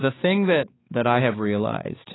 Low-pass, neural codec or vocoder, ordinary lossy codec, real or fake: 7.2 kHz; codec, 16 kHz, 4 kbps, X-Codec, HuBERT features, trained on balanced general audio; AAC, 16 kbps; fake